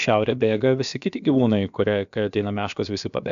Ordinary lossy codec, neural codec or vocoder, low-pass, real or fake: AAC, 96 kbps; codec, 16 kHz, about 1 kbps, DyCAST, with the encoder's durations; 7.2 kHz; fake